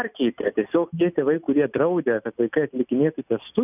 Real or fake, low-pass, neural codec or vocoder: real; 3.6 kHz; none